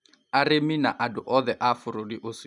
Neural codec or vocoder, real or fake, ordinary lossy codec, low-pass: none; real; none; 10.8 kHz